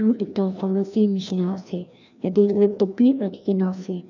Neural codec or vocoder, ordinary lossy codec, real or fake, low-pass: codec, 16 kHz, 1 kbps, FreqCodec, larger model; none; fake; 7.2 kHz